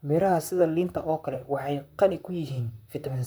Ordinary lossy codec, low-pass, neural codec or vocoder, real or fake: none; none; vocoder, 44.1 kHz, 128 mel bands, Pupu-Vocoder; fake